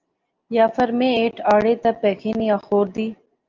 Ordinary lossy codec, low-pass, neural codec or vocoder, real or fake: Opus, 24 kbps; 7.2 kHz; none; real